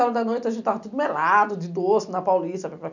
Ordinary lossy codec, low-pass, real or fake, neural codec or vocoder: none; 7.2 kHz; real; none